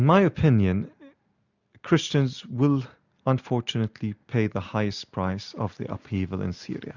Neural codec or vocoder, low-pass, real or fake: none; 7.2 kHz; real